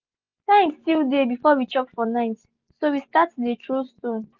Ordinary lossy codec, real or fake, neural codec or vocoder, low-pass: Opus, 32 kbps; real; none; 7.2 kHz